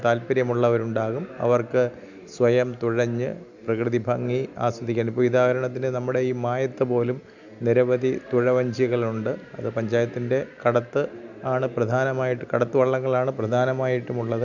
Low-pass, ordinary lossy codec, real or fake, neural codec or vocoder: 7.2 kHz; none; real; none